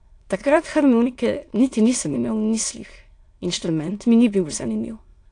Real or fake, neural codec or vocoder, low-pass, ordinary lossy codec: fake; autoencoder, 22.05 kHz, a latent of 192 numbers a frame, VITS, trained on many speakers; 9.9 kHz; AAC, 48 kbps